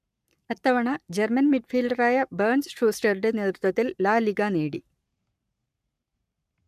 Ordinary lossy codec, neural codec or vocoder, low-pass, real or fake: none; codec, 44.1 kHz, 7.8 kbps, Pupu-Codec; 14.4 kHz; fake